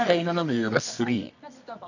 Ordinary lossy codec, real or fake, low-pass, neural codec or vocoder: none; fake; 7.2 kHz; codec, 24 kHz, 0.9 kbps, WavTokenizer, medium music audio release